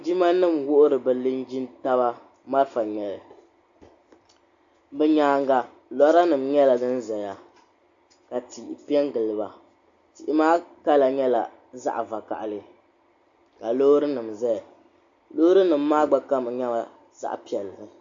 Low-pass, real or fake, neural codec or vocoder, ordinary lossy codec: 7.2 kHz; real; none; AAC, 64 kbps